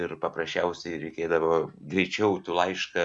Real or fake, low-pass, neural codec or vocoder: real; 10.8 kHz; none